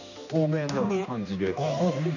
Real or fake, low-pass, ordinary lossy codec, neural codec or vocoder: fake; 7.2 kHz; none; codec, 16 kHz, 2 kbps, X-Codec, HuBERT features, trained on general audio